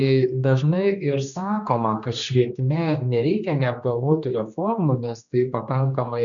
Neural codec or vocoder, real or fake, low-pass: codec, 16 kHz, 2 kbps, X-Codec, HuBERT features, trained on general audio; fake; 7.2 kHz